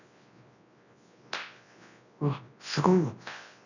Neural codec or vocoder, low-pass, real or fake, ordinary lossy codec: codec, 24 kHz, 0.9 kbps, WavTokenizer, large speech release; 7.2 kHz; fake; none